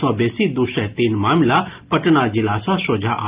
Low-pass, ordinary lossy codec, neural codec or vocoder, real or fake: 3.6 kHz; Opus, 32 kbps; none; real